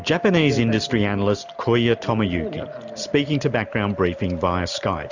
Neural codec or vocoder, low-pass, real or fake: none; 7.2 kHz; real